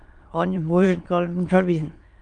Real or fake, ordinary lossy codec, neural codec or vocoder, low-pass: fake; Opus, 32 kbps; autoencoder, 22.05 kHz, a latent of 192 numbers a frame, VITS, trained on many speakers; 9.9 kHz